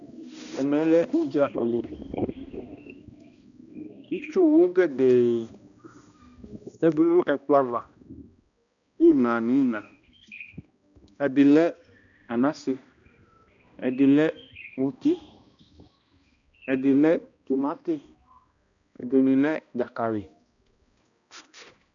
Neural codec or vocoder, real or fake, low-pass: codec, 16 kHz, 1 kbps, X-Codec, HuBERT features, trained on balanced general audio; fake; 7.2 kHz